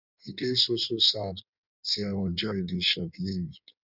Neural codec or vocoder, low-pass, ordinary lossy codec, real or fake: codec, 16 kHz in and 24 kHz out, 1.1 kbps, FireRedTTS-2 codec; 5.4 kHz; none; fake